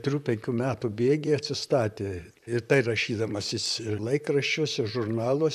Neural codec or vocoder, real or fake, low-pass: vocoder, 44.1 kHz, 128 mel bands, Pupu-Vocoder; fake; 14.4 kHz